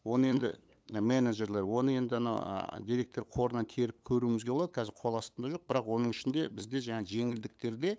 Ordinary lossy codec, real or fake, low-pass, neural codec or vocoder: none; fake; none; codec, 16 kHz, 8 kbps, FunCodec, trained on LibriTTS, 25 frames a second